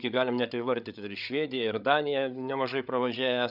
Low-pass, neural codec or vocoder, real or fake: 5.4 kHz; codec, 16 kHz, 8 kbps, FreqCodec, larger model; fake